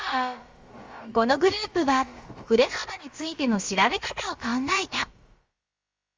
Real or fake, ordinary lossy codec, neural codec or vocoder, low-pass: fake; Opus, 32 kbps; codec, 16 kHz, about 1 kbps, DyCAST, with the encoder's durations; 7.2 kHz